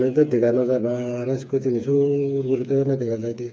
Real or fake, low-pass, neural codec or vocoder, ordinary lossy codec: fake; none; codec, 16 kHz, 4 kbps, FreqCodec, smaller model; none